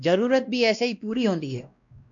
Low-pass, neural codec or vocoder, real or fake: 7.2 kHz; codec, 16 kHz, 1 kbps, X-Codec, WavLM features, trained on Multilingual LibriSpeech; fake